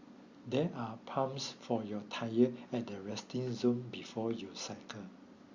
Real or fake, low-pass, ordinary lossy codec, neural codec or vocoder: real; 7.2 kHz; Opus, 64 kbps; none